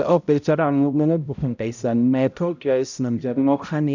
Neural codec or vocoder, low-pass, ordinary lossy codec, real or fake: codec, 16 kHz, 0.5 kbps, X-Codec, HuBERT features, trained on balanced general audio; 7.2 kHz; none; fake